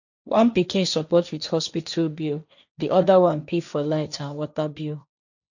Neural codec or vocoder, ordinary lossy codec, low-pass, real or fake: codec, 16 kHz, 1.1 kbps, Voila-Tokenizer; none; 7.2 kHz; fake